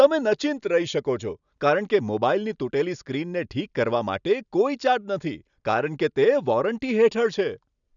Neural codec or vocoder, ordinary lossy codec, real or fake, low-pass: none; none; real; 7.2 kHz